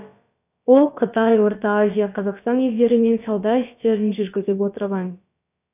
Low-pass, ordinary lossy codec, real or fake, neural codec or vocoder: 3.6 kHz; none; fake; codec, 16 kHz, about 1 kbps, DyCAST, with the encoder's durations